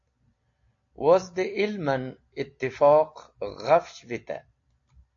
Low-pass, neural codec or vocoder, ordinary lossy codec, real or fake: 7.2 kHz; none; AAC, 48 kbps; real